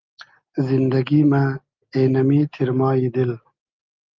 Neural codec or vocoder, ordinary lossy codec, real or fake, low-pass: none; Opus, 24 kbps; real; 7.2 kHz